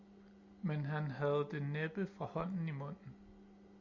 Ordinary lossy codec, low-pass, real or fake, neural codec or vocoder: Opus, 64 kbps; 7.2 kHz; real; none